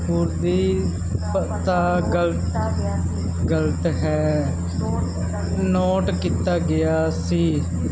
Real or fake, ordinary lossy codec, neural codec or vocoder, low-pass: real; none; none; none